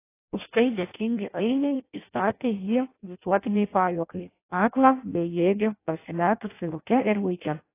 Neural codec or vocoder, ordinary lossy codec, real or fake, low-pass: codec, 16 kHz in and 24 kHz out, 0.6 kbps, FireRedTTS-2 codec; AAC, 24 kbps; fake; 3.6 kHz